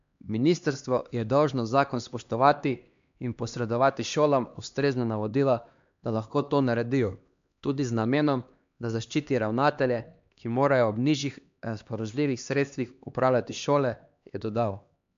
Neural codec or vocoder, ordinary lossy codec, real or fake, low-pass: codec, 16 kHz, 2 kbps, X-Codec, HuBERT features, trained on LibriSpeech; MP3, 64 kbps; fake; 7.2 kHz